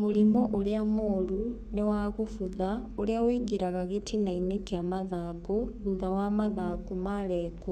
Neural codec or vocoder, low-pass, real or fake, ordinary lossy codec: codec, 32 kHz, 1.9 kbps, SNAC; 14.4 kHz; fake; none